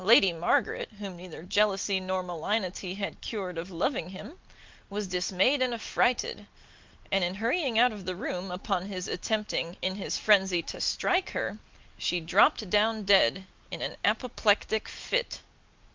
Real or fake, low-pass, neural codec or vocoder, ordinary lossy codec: real; 7.2 kHz; none; Opus, 24 kbps